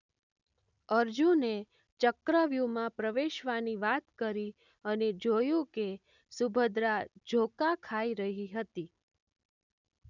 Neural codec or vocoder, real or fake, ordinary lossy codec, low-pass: none; real; none; 7.2 kHz